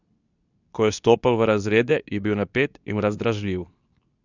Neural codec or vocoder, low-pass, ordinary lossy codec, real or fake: codec, 24 kHz, 0.9 kbps, WavTokenizer, medium speech release version 2; 7.2 kHz; none; fake